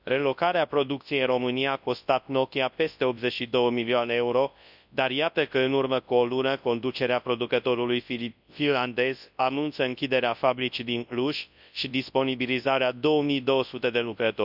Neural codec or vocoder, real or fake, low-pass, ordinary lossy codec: codec, 24 kHz, 0.9 kbps, WavTokenizer, large speech release; fake; 5.4 kHz; none